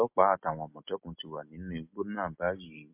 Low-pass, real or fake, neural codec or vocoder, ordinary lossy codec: 3.6 kHz; real; none; MP3, 32 kbps